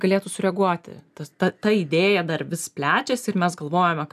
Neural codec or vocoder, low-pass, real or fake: none; 14.4 kHz; real